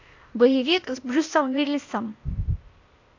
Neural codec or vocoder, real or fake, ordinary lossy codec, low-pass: codec, 16 kHz, 0.8 kbps, ZipCodec; fake; AAC, 48 kbps; 7.2 kHz